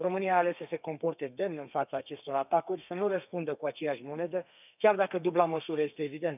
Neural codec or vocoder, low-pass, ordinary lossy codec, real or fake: codec, 32 kHz, 1.9 kbps, SNAC; 3.6 kHz; none; fake